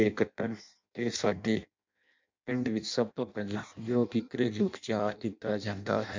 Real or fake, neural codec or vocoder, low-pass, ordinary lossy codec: fake; codec, 16 kHz in and 24 kHz out, 0.6 kbps, FireRedTTS-2 codec; 7.2 kHz; MP3, 64 kbps